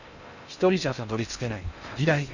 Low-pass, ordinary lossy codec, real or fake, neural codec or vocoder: 7.2 kHz; none; fake; codec, 16 kHz in and 24 kHz out, 0.6 kbps, FocalCodec, streaming, 2048 codes